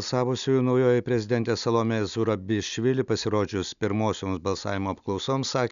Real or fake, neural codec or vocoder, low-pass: real; none; 7.2 kHz